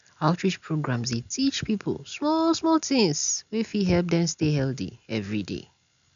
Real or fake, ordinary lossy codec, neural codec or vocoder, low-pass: real; none; none; 7.2 kHz